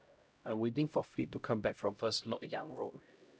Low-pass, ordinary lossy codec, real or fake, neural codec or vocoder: none; none; fake; codec, 16 kHz, 0.5 kbps, X-Codec, HuBERT features, trained on LibriSpeech